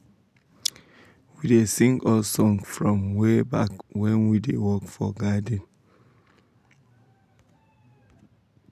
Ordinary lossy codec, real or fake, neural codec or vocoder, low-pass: none; real; none; 14.4 kHz